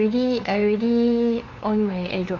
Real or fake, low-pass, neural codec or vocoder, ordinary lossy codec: fake; 7.2 kHz; codec, 16 kHz, 2 kbps, FunCodec, trained on LibriTTS, 25 frames a second; AAC, 32 kbps